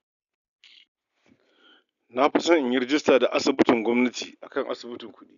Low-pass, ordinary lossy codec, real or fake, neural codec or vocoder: 7.2 kHz; none; real; none